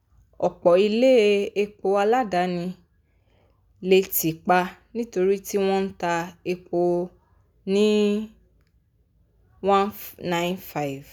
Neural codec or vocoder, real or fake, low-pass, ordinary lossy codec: none; real; 19.8 kHz; none